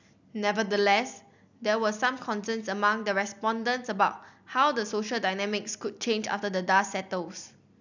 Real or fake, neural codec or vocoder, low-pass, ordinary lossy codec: real; none; 7.2 kHz; none